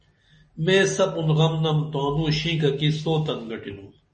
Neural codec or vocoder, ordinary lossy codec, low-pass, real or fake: none; MP3, 32 kbps; 10.8 kHz; real